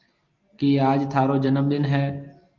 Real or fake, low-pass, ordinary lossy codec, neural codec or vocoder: real; 7.2 kHz; Opus, 32 kbps; none